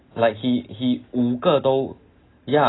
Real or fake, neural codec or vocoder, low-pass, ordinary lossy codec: real; none; 7.2 kHz; AAC, 16 kbps